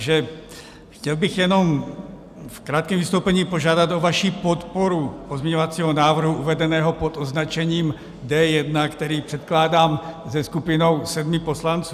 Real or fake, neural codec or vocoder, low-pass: real; none; 14.4 kHz